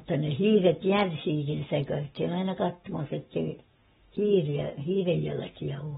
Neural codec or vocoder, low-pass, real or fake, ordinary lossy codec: codec, 44.1 kHz, 7.8 kbps, Pupu-Codec; 19.8 kHz; fake; AAC, 16 kbps